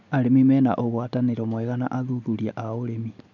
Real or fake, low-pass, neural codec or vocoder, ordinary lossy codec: real; 7.2 kHz; none; none